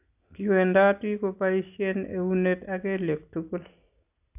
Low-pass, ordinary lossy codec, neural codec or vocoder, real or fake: 3.6 kHz; AAC, 32 kbps; none; real